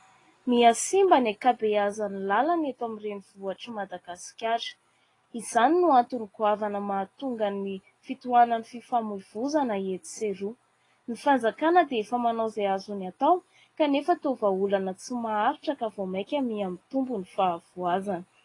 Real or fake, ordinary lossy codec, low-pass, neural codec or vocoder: real; AAC, 32 kbps; 10.8 kHz; none